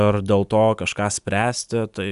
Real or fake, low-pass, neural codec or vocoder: real; 10.8 kHz; none